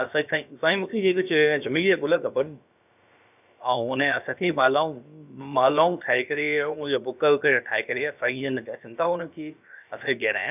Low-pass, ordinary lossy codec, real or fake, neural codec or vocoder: 3.6 kHz; none; fake; codec, 16 kHz, about 1 kbps, DyCAST, with the encoder's durations